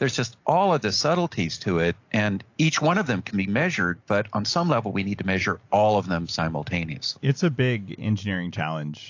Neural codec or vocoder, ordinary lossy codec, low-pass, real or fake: none; AAC, 48 kbps; 7.2 kHz; real